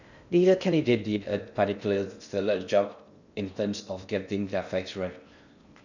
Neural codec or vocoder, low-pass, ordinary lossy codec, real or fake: codec, 16 kHz in and 24 kHz out, 0.6 kbps, FocalCodec, streaming, 2048 codes; 7.2 kHz; none; fake